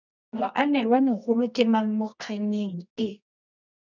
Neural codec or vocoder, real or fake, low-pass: codec, 24 kHz, 0.9 kbps, WavTokenizer, medium music audio release; fake; 7.2 kHz